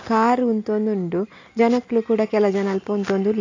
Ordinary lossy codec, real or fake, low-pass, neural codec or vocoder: AAC, 48 kbps; real; 7.2 kHz; none